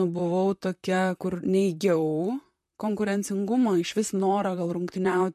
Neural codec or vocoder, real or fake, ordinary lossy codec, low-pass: vocoder, 44.1 kHz, 128 mel bands, Pupu-Vocoder; fake; MP3, 64 kbps; 14.4 kHz